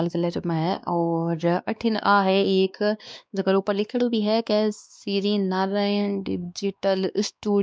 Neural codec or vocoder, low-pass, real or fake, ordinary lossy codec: codec, 16 kHz, 2 kbps, X-Codec, WavLM features, trained on Multilingual LibriSpeech; none; fake; none